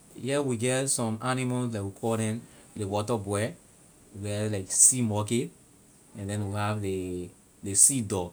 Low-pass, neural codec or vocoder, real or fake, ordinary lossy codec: none; none; real; none